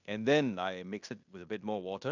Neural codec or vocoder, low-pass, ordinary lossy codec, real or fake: codec, 16 kHz in and 24 kHz out, 0.9 kbps, LongCat-Audio-Codec, fine tuned four codebook decoder; 7.2 kHz; none; fake